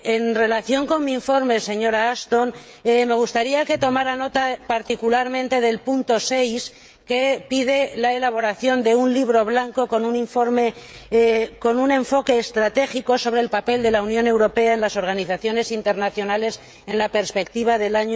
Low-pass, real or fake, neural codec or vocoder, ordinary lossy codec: none; fake; codec, 16 kHz, 16 kbps, FreqCodec, smaller model; none